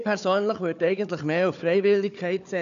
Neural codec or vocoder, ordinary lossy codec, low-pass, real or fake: codec, 16 kHz, 4 kbps, FunCodec, trained on Chinese and English, 50 frames a second; none; 7.2 kHz; fake